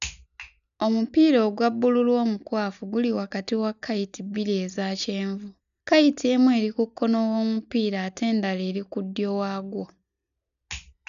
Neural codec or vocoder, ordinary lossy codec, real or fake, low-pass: none; none; real; 7.2 kHz